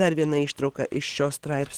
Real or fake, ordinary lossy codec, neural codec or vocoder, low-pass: fake; Opus, 16 kbps; codec, 44.1 kHz, 7.8 kbps, DAC; 19.8 kHz